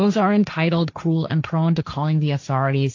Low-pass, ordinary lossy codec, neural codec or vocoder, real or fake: 7.2 kHz; AAC, 48 kbps; codec, 16 kHz, 1.1 kbps, Voila-Tokenizer; fake